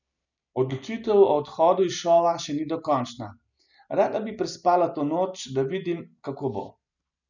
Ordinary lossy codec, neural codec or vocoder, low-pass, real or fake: none; none; 7.2 kHz; real